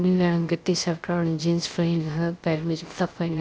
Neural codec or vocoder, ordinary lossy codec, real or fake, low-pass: codec, 16 kHz, 0.3 kbps, FocalCodec; none; fake; none